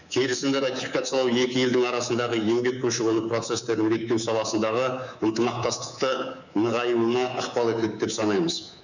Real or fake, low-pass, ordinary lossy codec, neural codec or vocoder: fake; 7.2 kHz; none; codec, 24 kHz, 3.1 kbps, DualCodec